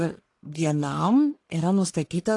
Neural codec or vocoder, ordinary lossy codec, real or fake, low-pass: codec, 44.1 kHz, 1.7 kbps, Pupu-Codec; AAC, 48 kbps; fake; 10.8 kHz